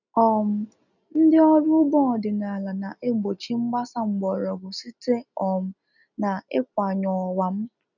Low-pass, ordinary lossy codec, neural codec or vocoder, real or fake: 7.2 kHz; none; none; real